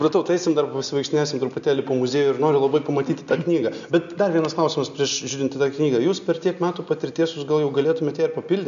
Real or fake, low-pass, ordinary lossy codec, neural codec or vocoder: real; 7.2 kHz; MP3, 96 kbps; none